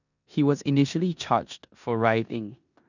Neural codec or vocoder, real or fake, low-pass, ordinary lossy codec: codec, 16 kHz in and 24 kHz out, 0.9 kbps, LongCat-Audio-Codec, four codebook decoder; fake; 7.2 kHz; none